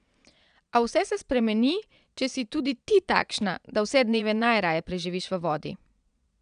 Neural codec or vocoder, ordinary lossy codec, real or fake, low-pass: vocoder, 22.05 kHz, 80 mel bands, Vocos; none; fake; 9.9 kHz